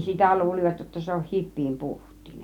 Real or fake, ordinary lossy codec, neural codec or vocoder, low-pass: real; none; none; 19.8 kHz